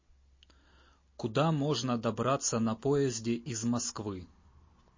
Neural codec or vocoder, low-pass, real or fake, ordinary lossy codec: none; 7.2 kHz; real; MP3, 32 kbps